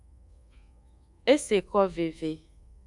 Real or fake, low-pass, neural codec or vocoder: fake; 10.8 kHz; codec, 24 kHz, 1.2 kbps, DualCodec